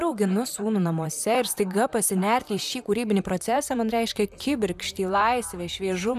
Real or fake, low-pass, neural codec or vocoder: fake; 14.4 kHz; vocoder, 44.1 kHz, 128 mel bands, Pupu-Vocoder